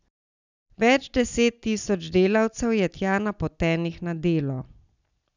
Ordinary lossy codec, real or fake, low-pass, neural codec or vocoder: none; real; 7.2 kHz; none